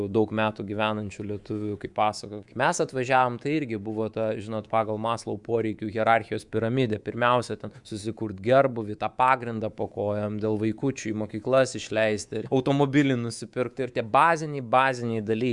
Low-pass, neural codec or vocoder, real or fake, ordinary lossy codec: 10.8 kHz; codec, 24 kHz, 3.1 kbps, DualCodec; fake; Opus, 64 kbps